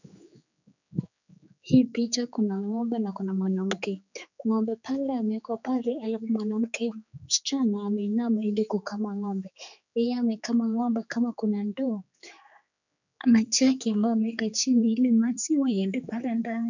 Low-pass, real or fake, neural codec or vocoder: 7.2 kHz; fake; codec, 16 kHz, 4 kbps, X-Codec, HuBERT features, trained on general audio